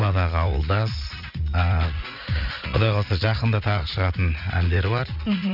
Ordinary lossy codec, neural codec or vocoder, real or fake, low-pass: none; vocoder, 44.1 kHz, 80 mel bands, Vocos; fake; 5.4 kHz